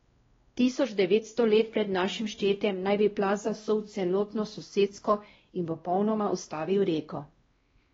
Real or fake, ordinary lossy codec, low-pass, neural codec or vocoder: fake; AAC, 24 kbps; 7.2 kHz; codec, 16 kHz, 1 kbps, X-Codec, WavLM features, trained on Multilingual LibriSpeech